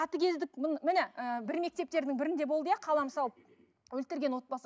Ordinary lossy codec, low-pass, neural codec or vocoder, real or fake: none; none; none; real